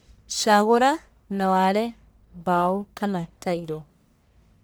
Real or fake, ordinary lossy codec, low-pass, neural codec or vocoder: fake; none; none; codec, 44.1 kHz, 1.7 kbps, Pupu-Codec